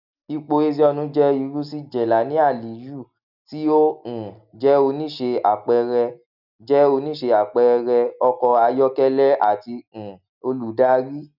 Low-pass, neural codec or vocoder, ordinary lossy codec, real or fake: 5.4 kHz; none; none; real